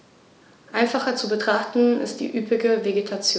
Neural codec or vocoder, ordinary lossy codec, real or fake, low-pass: none; none; real; none